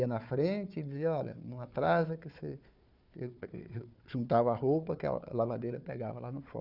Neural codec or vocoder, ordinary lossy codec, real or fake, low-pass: codec, 16 kHz, 4 kbps, FunCodec, trained on Chinese and English, 50 frames a second; none; fake; 5.4 kHz